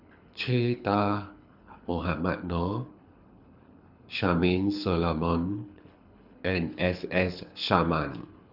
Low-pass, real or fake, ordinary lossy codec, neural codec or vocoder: 5.4 kHz; fake; none; codec, 24 kHz, 6 kbps, HILCodec